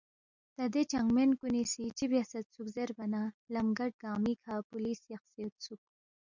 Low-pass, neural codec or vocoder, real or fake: 7.2 kHz; none; real